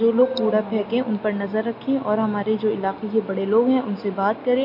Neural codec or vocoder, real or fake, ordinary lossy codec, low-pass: none; real; MP3, 32 kbps; 5.4 kHz